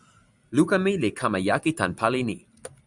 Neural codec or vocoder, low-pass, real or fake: none; 10.8 kHz; real